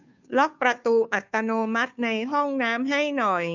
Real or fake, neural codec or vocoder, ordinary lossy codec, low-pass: fake; codec, 16 kHz, 2 kbps, FunCodec, trained on Chinese and English, 25 frames a second; none; 7.2 kHz